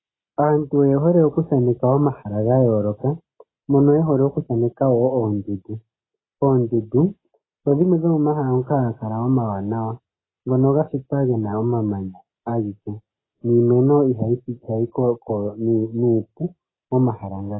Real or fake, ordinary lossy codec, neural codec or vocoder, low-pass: real; AAC, 16 kbps; none; 7.2 kHz